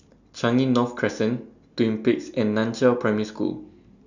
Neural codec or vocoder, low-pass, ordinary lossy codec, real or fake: none; 7.2 kHz; none; real